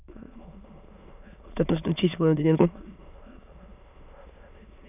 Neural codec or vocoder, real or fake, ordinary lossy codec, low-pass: autoencoder, 22.05 kHz, a latent of 192 numbers a frame, VITS, trained on many speakers; fake; none; 3.6 kHz